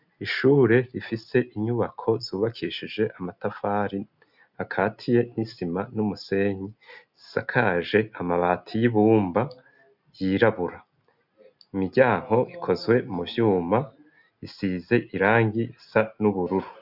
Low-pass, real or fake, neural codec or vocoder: 5.4 kHz; real; none